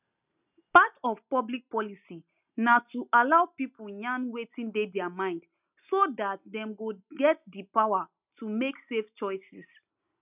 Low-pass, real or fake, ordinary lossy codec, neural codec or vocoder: 3.6 kHz; real; none; none